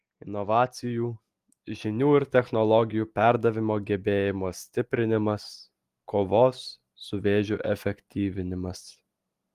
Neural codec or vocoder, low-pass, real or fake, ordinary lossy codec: none; 14.4 kHz; real; Opus, 24 kbps